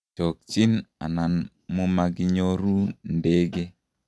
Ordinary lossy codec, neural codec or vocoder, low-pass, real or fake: none; none; none; real